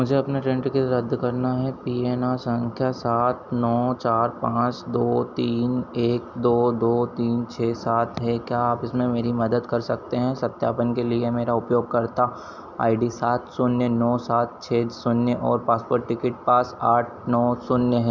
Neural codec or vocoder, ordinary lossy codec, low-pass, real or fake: none; none; 7.2 kHz; real